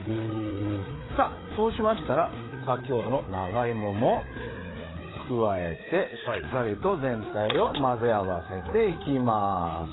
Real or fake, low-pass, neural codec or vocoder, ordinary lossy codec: fake; 7.2 kHz; codec, 16 kHz, 4 kbps, FreqCodec, larger model; AAC, 16 kbps